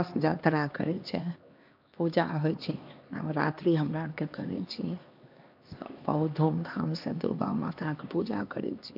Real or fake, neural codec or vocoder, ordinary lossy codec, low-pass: fake; codec, 16 kHz, 2 kbps, X-Codec, HuBERT features, trained on LibriSpeech; MP3, 48 kbps; 5.4 kHz